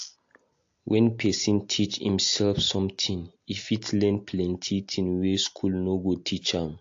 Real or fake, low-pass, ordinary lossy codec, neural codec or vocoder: real; 7.2 kHz; AAC, 48 kbps; none